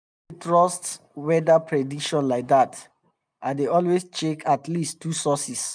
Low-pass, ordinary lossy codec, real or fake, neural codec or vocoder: 9.9 kHz; none; real; none